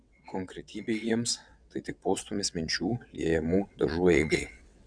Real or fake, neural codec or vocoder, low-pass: fake; vocoder, 22.05 kHz, 80 mel bands, WaveNeXt; 9.9 kHz